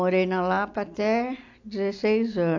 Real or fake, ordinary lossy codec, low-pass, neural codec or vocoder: real; AAC, 48 kbps; 7.2 kHz; none